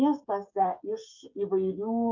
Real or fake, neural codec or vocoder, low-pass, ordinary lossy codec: fake; codec, 16 kHz, 16 kbps, FreqCodec, smaller model; 7.2 kHz; MP3, 64 kbps